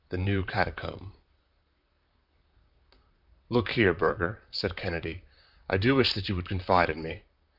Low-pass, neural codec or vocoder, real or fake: 5.4 kHz; vocoder, 44.1 kHz, 128 mel bands, Pupu-Vocoder; fake